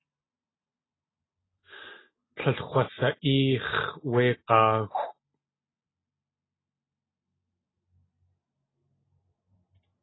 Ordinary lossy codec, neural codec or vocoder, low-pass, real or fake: AAC, 16 kbps; none; 7.2 kHz; real